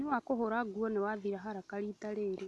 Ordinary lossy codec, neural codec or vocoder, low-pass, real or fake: none; none; none; real